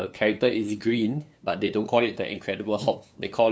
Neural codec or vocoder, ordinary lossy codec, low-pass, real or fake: codec, 16 kHz, 2 kbps, FunCodec, trained on LibriTTS, 25 frames a second; none; none; fake